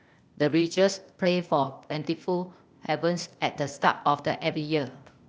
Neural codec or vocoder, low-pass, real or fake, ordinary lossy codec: codec, 16 kHz, 0.8 kbps, ZipCodec; none; fake; none